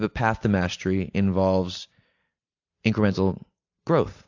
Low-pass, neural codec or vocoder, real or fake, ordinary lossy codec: 7.2 kHz; none; real; AAC, 48 kbps